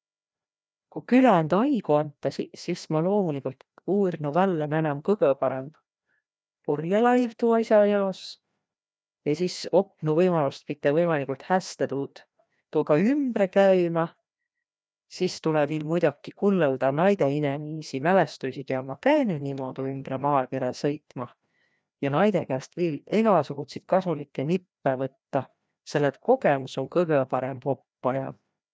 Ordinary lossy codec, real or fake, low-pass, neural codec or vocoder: none; fake; none; codec, 16 kHz, 1 kbps, FreqCodec, larger model